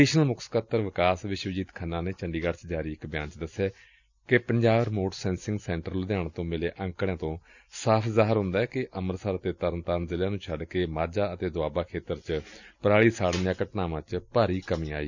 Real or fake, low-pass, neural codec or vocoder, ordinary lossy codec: real; 7.2 kHz; none; none